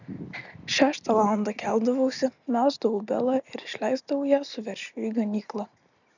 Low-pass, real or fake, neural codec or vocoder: 7.2 kHz; real; none